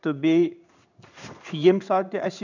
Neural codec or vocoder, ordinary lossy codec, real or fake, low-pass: codec, 16 kHz in and 24 kHz out, 1 kbps, XY-Tokenizer; none; fake; 7.2 kHz